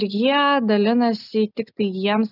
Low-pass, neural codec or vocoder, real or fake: 5.4 kHz; none; real